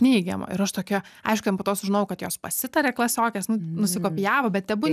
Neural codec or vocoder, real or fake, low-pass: none; real; 14.4 kHz